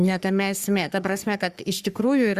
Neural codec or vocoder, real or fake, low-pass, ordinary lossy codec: codec, 44.1 kHz, 3.4 kbps, Pupu-Codec; fake; 14.4 kHz; Opus, 64 kbps